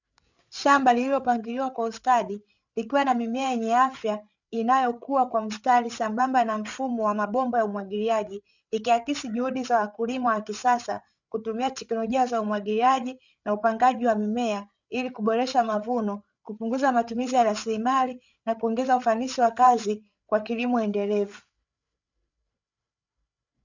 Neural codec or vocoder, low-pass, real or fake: codec, 16 kHz, 8 kbps, FreqCodec, larger model; 7.2 kHz; fake